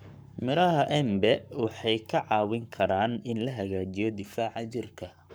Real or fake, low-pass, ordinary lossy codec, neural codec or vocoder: fake; none; none; codec, 44.1 kHz, 7.8 kbps, Pupu-Codec